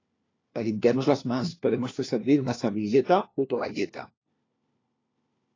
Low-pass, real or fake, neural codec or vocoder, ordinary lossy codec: 7.2 kHz; fake; codec, 16 kHz, 1 kbps, FunCodec, trained on LibriTTS, 50 frames a second; AAC, 32 kbps